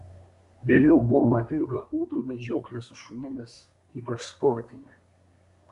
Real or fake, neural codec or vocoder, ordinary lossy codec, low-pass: fake; codec, 24 kHz, 1 kbps, SNAC; MP3, 96 kbps; 10.8 kHz